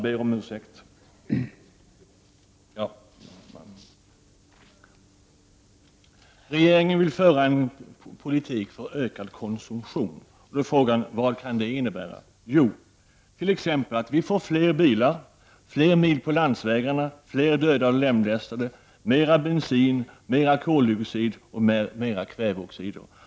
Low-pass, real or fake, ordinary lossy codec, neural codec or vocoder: none; real; none; none